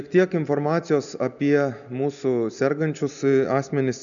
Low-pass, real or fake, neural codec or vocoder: 7.2 kHz; real; none